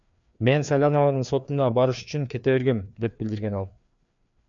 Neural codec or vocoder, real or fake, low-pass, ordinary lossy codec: codec, 16 kHz, 2 kbps, FreqCodec, larger model; fake; 7.2 kHz; MP3, 64 kbps